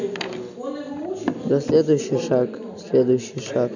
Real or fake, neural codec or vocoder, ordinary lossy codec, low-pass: real; none; none; 7.2 kHz